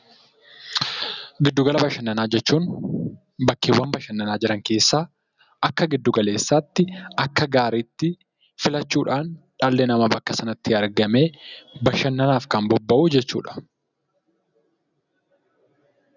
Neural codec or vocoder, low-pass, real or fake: none; 7.2 kHz; real